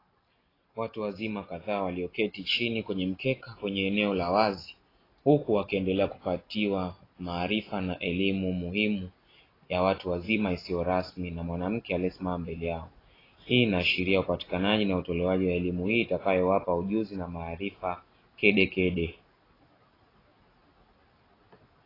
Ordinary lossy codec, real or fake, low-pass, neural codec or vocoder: AAC, 24 kbps; real; 5.4 kHz; none